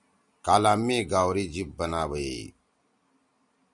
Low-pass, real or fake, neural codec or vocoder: 10.8 kHz; real; none